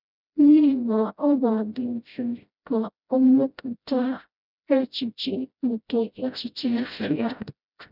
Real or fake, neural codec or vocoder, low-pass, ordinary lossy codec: fake; codec, 16 kHz, 0.5 kbps, FreqCodec, smaller model; 5.4 kHz; none